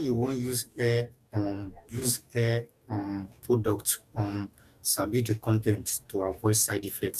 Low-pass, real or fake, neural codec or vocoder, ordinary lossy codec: 14.4 kHz; fake; codec, 44.1 kHz, 2.6 kbps, DAC; none